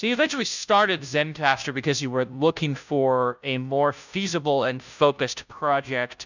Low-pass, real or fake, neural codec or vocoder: 7.2 kHz; fake; codec, 16 kHz, 0.5 kbps, FunCodec, trained on Chinese and English, 25 frames a second